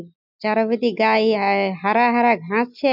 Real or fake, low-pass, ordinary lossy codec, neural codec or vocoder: real; 5.4 kHz; none; none